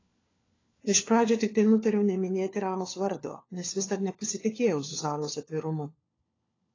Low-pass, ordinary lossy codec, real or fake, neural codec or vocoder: 7.2 kHz; AAC, 32 kbps; fake; codec, 16 kHz, 4 kbps, FunCodec, trained on LibriTTS, 50 frames a second